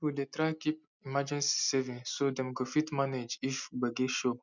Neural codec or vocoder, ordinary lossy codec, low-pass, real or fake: none; none; 7.2 kHz; real